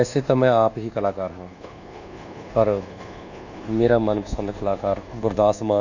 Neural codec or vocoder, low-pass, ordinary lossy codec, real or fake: codec, 24 kHz, 1.2 kbps, DualCodec; 7.2 kHz; none; fake